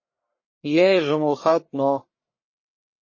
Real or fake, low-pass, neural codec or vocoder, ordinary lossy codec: fake; 7.2 kHz; codec, 44.1 kHz, 1.7 kbps, Pupu-Codec; MP3, 32 kbps